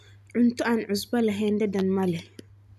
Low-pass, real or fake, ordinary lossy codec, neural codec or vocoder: 14.4 kHz; real; none; none